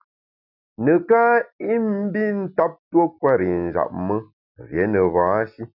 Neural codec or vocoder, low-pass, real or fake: none; 5.4 kHz; real